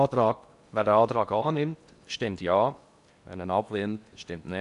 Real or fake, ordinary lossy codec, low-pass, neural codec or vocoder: fake; none; 10.8 kHz; codec, 16 kHz in and 24 kHz out, 0.8 kbps, FocalCodec, streaming, 65536 codes